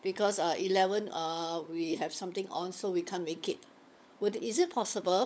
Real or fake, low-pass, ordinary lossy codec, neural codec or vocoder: fake; none; none; codec, 16 kHz, 16 kbps, FunCodec, trained on LibriTTS, 50 frames a second